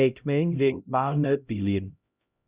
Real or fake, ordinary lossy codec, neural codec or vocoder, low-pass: fake; Opus, 64 kbps; codec, 16 kHz, 0.5 kbps, X-Codec, HuBERT features, trained on LibriSpeech; 3.6 kHz